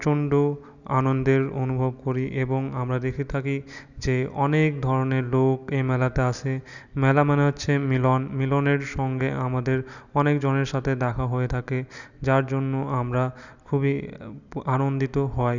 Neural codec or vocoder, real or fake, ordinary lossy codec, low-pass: none; real; none; 7.2 kHz